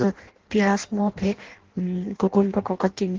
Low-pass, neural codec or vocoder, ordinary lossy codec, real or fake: 7.2 kHz; codec, 16 kHz in and 24 kHz out, 0.6 kbps, FireRedTTS-2 codec; Opus, 16 kbps; fake